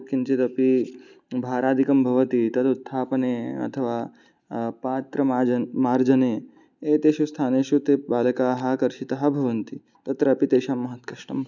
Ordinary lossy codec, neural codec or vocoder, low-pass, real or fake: none; none; 7.2 kHz; real